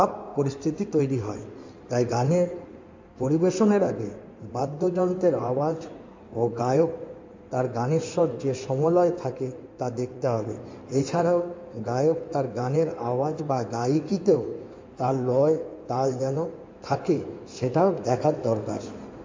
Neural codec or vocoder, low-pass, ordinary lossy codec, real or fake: codec, 16 kHz in and 24 kHz out, 2.2 kbps, FireRedTTS-2 codec; 7.2 kHz; MP3, 48 kbps; fake